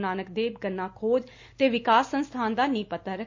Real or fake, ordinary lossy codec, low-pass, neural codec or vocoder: real; MP3, 64 kbps; 7.2 kHz; none